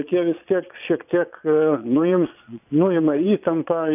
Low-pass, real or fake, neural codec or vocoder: 3.6 kHz; fake; vocoder, 22.05 kHz, 80 mel bands, Vocos